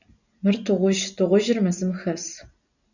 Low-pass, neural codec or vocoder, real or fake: 7.2 kHz; none; real